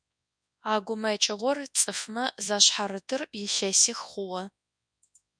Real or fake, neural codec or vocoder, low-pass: fake; codec, 24 kHz, 0.9 kbps, WavTokenizer, large speech release; 9.9 kHz